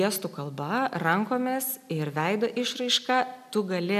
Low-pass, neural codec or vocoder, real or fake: 14.4 kHz; none; real